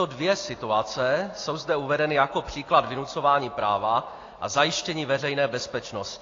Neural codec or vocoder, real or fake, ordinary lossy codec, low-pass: none; real; AAC, 32 kbps; 7.2 kHz